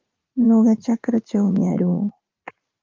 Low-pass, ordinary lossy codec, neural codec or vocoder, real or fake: 7.2 kHz; Opus, 32 kbps; vocoder, 44.1 kHz, 80 mel bands, Vocos; fake